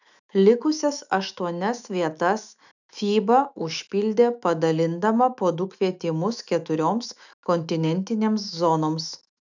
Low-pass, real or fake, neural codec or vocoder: 7.2 kHz; fake; autoencoder, 48 kHz, 128 numbers a frame, DAC-VAE, trained on Japanese speech